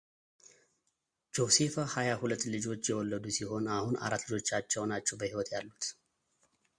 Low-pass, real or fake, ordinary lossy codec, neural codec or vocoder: 9.9 kHz; real; Opus, 64 kbps; none